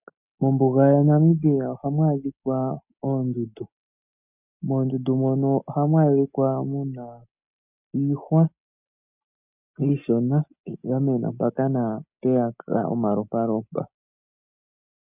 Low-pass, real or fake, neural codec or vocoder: 3.6 kHz; real; none